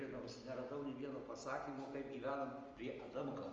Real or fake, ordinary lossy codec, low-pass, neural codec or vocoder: real; Opus, 32 kbps; 7.2 kHz; none